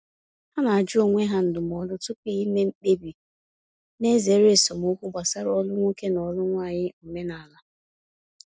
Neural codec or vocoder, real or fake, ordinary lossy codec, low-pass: none; real; none; none